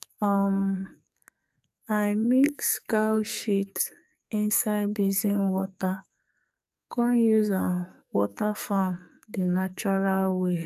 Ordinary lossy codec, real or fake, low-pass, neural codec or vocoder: none; fake; 14.4 kHz; codec, 44.1 kHz, 2.6 kbps, SNAC